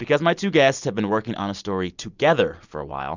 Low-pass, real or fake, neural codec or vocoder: 7.2 kHz; real; none